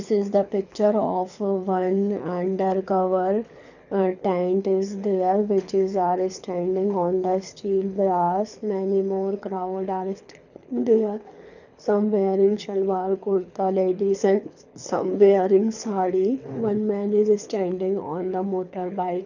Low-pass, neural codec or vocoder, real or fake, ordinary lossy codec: 7.2 kHz; codec, 24 kHz, 6 kbps, HILCodec; fake; none